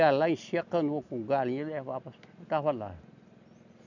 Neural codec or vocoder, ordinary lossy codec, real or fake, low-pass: none; none; real; 7.2 kHz